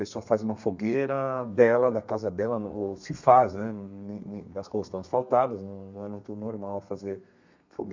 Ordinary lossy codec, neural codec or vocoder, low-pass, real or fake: MP3, 64 kbps; codec, 32 kHz, 1.9 kbps, SNAC; 7.2 kHz; fake